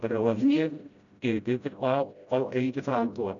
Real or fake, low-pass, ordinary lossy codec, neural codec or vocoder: fake; 7.2 kHz; none; codec, 16 kHz, 0.5 kbps, FreqCodec, smaller model